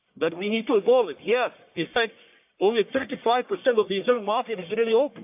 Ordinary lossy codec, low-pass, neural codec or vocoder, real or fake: AAC, 32 kbps; 3.6 kHz; codec, 44.1 kHz, 1.7 kbps, Pupu-Codec; fake